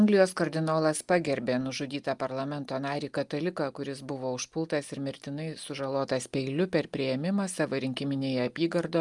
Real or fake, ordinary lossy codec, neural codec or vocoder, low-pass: real; Opus, 32 kbps; none; 10.8 kHz